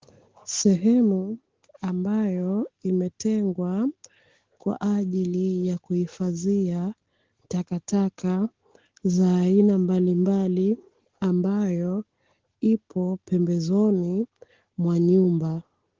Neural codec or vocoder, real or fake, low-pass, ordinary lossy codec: none; real; 7.2 kHz; Opus, 16 kbps